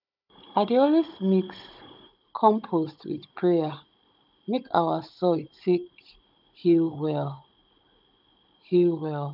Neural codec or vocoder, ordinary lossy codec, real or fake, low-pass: codec, 16 kHz, 16 kbps, FunCodec, trained on Chinese and English, 50 frames a second; none; fake; 5.4 kHz